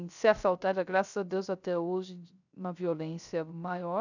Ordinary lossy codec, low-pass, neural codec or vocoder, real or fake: none; 7.2 kHz; codec, 16 kHz, 0.3 kbps, FocalCodec; fake